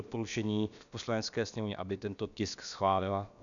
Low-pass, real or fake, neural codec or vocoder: 7.2 kHz; fake; codec, 16 kHz, about 1 kbps, DyCAST, with the encoder's durations